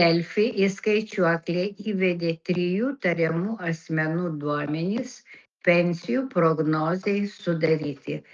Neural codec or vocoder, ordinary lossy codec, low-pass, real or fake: none; Opus, 24 kbps; 7.2 kHz; real